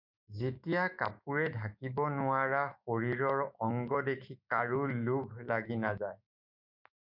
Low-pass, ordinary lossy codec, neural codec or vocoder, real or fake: 5.4 kHz; MP3, 48 kbps; autoencoder, 48 kHz, 128 numbers a frame, DAC-VAE, trained on Japanese speech; fake